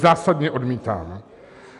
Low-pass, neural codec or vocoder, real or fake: 10.8 kHz; none; real